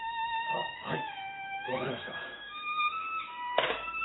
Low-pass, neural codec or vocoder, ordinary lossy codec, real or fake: 7.2 kHz; autoencoder, 48 kHz, 128 numbers a frame, DAC-VAE, trained on Japanese speech; AAC, 16 kbps; fake